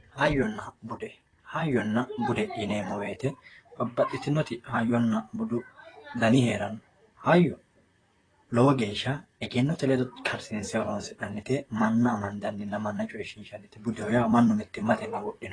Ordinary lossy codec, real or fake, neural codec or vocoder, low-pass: AAC, 32 kbps; fake; vocoder, 44.1 kHz, 128 mel bands, Pupu-Vocoder; 9.9 kHz